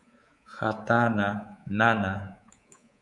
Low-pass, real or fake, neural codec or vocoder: 10.8 kHz; fake; codec, 24 kHz, 3.1 kbps, DualCodec